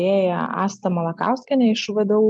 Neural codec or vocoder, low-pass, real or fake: none; 9.9 kHz; real